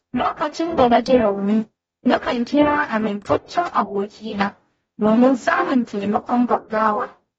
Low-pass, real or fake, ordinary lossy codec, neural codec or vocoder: 19.8 kHz; fake; AAC, 24 kbps; codec, 44.1 kHz, 0.9 kbps, DAC